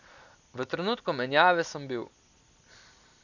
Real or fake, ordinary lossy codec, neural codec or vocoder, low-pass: real; none; none; 7.2 kHz